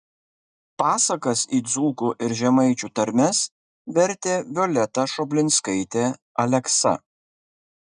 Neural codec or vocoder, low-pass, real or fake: none; 9.9 kHz; real